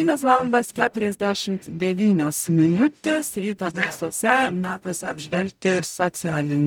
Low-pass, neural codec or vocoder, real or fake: 19.8 kHz; codec, 44.1 kHz, 0.9 kbps, DAC; fake